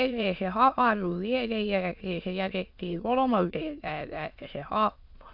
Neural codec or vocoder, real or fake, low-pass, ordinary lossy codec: autoencoder, 22.05 kHz, a latent of 192 numbers a frame, VITS, trained on many speakers; fake; 5.4 kHz; AAC, 48 kbps